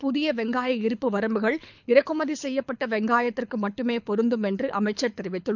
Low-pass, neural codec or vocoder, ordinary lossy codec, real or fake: 7.2 kHz; codec, 24 kHz, 6 kbps, HILCodec; none; fake